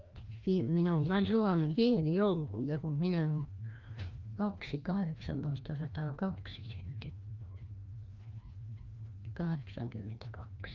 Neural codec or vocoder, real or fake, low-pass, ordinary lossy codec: codec, 16 kHz, 1 kbps, FreqCodec, larger model; fake; 7.2 kHz; Opus, 32 kbps